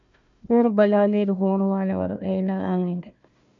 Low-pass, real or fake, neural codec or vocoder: 7.2 kHz; fake; codec, 16 kHz, 1 kbps, FunCodec, trained on Chinese and English, 50 frames a second